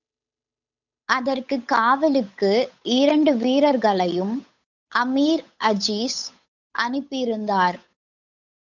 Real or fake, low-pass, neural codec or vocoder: fake; 7.2 kHz; codec, 16 kHz, 8 kbps, FunCodec, trained on Chinese and English, 25 frames a second